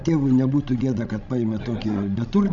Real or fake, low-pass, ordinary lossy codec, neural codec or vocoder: fake; 7.2 kHz; MP3, 64 kbps; codec, 16 kHz, 16 kbps, FreqCodec, larger model